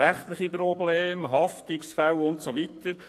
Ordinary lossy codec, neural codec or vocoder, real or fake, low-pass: AAC, 64 kbps; codec, 44.1 kHz, 2.6 kbps, SNAC; fake; 14.4 kHz